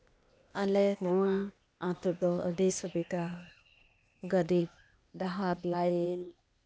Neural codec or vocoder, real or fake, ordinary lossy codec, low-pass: codec, 16 kHz, 0.8 kbps, ZipCodec; fake; none; none